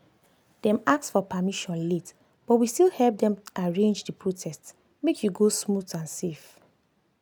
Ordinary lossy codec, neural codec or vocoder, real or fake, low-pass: none; none; real; none